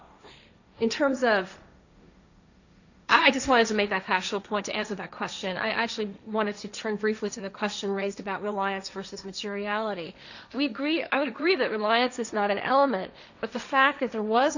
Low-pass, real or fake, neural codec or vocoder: 7.2 kHz; fake; codec, 16 kHz, 1.1 kbps, Voila-Tokenizer